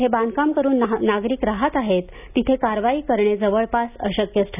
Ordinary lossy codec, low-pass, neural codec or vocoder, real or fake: none; 3.6 kHz; none; real